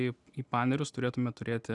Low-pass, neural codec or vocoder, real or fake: 10.8 kHz; none; real